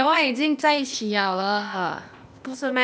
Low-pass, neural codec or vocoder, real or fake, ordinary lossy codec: none; codec, 16 kHz, 0.8 kbps, ZipCodec; fake; none